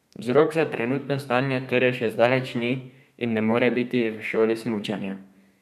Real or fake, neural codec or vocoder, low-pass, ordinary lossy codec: fake; codec, 32 kHz, 1.9 kbps, SNAC; 14.4 kHz; none